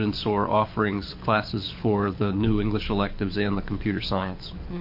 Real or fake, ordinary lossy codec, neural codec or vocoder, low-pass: fake; MP3, 32 kbps; codec, 24 kHz, 6 kbps, HILCodec; 5.4 kHz